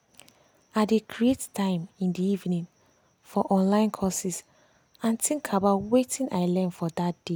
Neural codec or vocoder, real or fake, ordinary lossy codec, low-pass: none; real; none; none